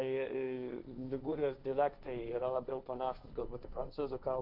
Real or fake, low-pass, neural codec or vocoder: fake; 5.4 kHz; codec, 16 kHz, 1.1 kbps, Voila-Tokenizer